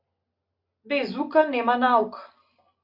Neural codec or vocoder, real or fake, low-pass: none; real; 5.4 kHz